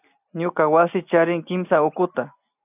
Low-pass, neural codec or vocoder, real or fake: 3.6 kHz; none; real